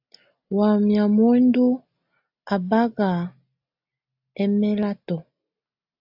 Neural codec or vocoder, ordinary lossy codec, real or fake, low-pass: none; AAC, 48 kbps; real; 5.4 kHz